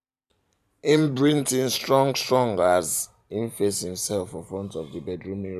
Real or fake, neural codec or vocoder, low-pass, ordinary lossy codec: fake; vocoder, 44.1 kHz, 128 mel bands every 512 samples, BigVGAN v2; 14.4 kHz; none